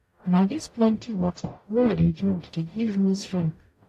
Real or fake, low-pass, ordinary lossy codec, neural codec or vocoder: fake; 14.4 kHz; AAC, 64 kbps; codec, 44.1 kHz, 0.9 kbps, DAC